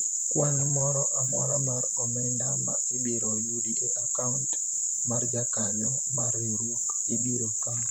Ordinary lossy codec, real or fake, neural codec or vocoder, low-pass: none; fake; vocoder, 44.1 kHz, 128 mel bands, Pupu-Vocoder; none